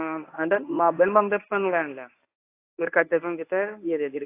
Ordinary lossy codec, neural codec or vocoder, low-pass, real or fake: AAC, 24 kbps; codec, 24 kHz, 0.9 kbps, WavTokenizer, medium speech release version 1; 3.6 kHz; fake